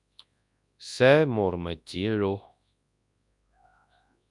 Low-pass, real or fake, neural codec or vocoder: 10.8 kHz; fake; codec, 24 kHz, 0.9 kbps, WavTokenizer, large speech release